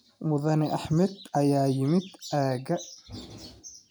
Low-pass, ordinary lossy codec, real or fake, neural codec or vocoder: none; none; real; none